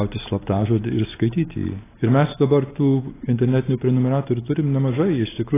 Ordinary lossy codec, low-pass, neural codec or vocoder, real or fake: AAC, 16 kbps; 3.6 kHz; none; real